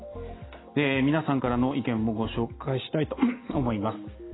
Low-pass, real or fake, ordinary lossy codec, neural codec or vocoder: 7.2 kHz; real; AAC, 16 kbps; none